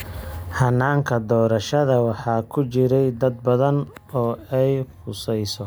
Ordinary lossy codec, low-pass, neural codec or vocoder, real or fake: none; none; none; real